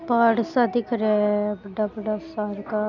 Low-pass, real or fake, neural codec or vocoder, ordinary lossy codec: 7.2 kHz; real; none; none